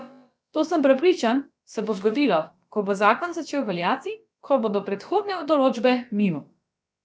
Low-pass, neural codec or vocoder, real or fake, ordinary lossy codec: none; codec, 16 kHz, about 1 kbps, DyCAST, with the encoder's durations; fake; none